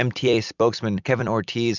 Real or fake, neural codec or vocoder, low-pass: fake; vocoder, 44.1 kHz, 128 mel bands every 256 samples, BigVGAN v2; 7.2 kHz